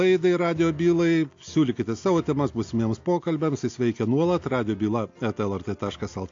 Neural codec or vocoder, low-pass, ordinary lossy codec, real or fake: none; 7.2 kHz; AAC, 48 kbps; real